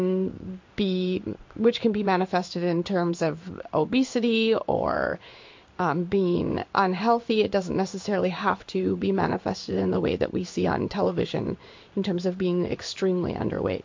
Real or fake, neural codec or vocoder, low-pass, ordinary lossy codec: fake; codec, 16 kHz in and 24 kHz out, 1 kbps, XY-Tokenizer; 7.2 kHz; MP3, 48 kbps